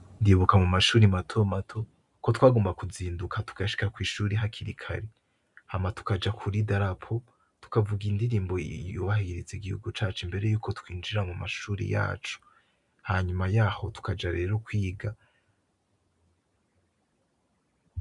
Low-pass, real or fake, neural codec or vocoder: 10.8 kHz; real; none